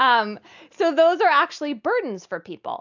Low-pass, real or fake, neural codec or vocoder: 7.2 kHz; real; none